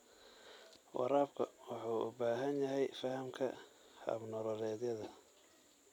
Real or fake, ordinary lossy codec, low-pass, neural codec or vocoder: fake; none; 19.8 kHz; vocoder, 44.1 kHz, 128 mel bands every 256 samples, BigVGAN v2